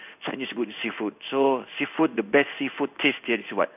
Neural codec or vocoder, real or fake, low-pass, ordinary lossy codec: codec, 16 kHz in and 24 kHz out, 1 kbps, XY-Tokenizer; fake; 3.6 kHz; none